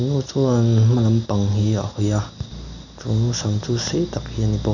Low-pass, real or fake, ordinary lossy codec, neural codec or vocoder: 7.2 kHz; real; none; none